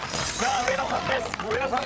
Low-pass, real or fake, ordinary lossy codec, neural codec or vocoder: none; fake; none; codec, 16 kHz, 8 kbps, FreqCodec, larger model